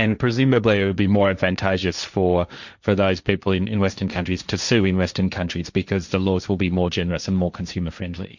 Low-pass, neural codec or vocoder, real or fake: 7.2 kHz; codec, 16 kHz, 1.1 kbps, Voila-Tokenizer; fake